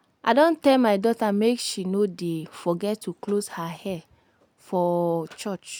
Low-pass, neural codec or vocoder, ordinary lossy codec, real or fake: none; none; none; real